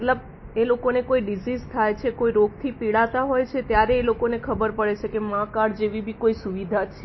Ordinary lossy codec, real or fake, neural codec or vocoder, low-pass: MP3, 24 kbps; real; none; 7.2 kHz